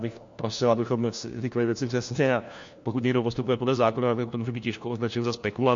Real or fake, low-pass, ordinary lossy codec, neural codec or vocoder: fake; 7.2 kHz; MP3, 48 kbps; codec, 16 kHz, 1 kbps, FunCodec, trained on LibriTTS, 50 frames a second